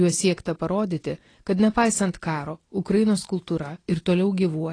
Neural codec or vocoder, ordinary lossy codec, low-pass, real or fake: none; AAC, 32 kbps; 9.9 kHz; real